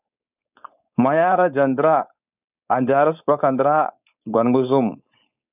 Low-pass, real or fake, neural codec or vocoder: 3.6 kHz; fake; codec, 16 kHz, 4.8 kbps, FACodec